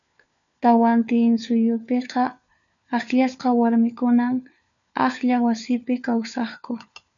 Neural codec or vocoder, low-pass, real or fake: codec, 16 kHz, 4 kbps, FunCodec, trained on LibriTTS, 50 frames a second; 7.2 kHz; fake